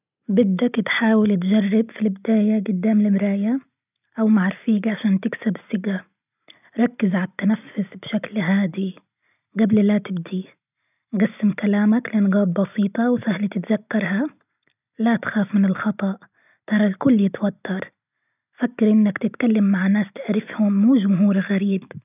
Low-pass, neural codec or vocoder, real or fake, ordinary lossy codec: 3.6 kHz; none; real; none